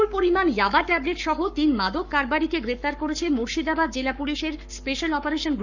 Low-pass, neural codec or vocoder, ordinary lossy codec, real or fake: 7.2 kHz; codec, 44.1 kHz, 7.8 kbps, Pupu-Codec; none; fake